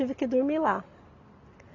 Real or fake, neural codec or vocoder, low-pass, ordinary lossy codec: real; none; 7.2 kHz; none